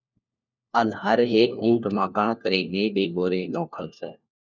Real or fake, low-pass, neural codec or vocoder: fake; 7.2 kHz; codec, 16 kHz, 1 kbps, FunCodec, trained on LibriTTS, 50 frames a second